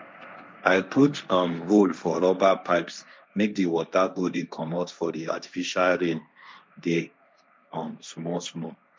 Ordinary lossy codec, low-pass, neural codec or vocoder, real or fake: none; none; codec, 16 kHz, 1.1 kbps, Voila-Tokenizer; fake